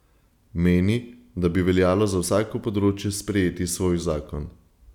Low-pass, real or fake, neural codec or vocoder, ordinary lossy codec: 19.8 kHz; real; none; none